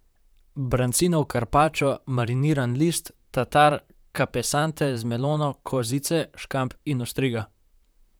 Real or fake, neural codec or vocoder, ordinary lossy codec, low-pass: fake; vocoder, 44.1 kHz, 128 mel bands, Pupu-Vocoder; none; none